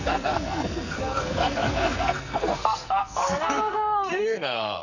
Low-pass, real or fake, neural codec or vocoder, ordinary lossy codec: 7.2 kHz; fake; codec, 44.1 kHz, 2.6 kbps, SNAC; none